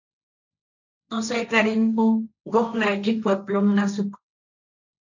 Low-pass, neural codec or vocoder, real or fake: 7.2 kHz; codec, 16 kHz, 1.1 kbps, Voila-Tokenizer; fake